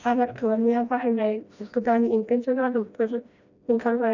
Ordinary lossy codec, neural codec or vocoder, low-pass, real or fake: none; codec, 16 kHz, 1 kbps, FreqCodec, smaller model; 7.2 kHz; fake